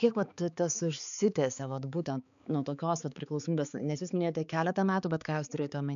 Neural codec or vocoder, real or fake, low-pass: codec, 16 kHz, 4 kbps, X-Codec, HuBERT features, trained on balanced general audio; fake; 7.2 kHz